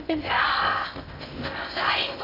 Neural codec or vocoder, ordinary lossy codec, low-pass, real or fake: codec, 16 kHz in and 24 kHz out, 0.6 kbps, FocalCodec, streaming, 2048 codes; AAC, 32 kbps; 5.4 kHz; fake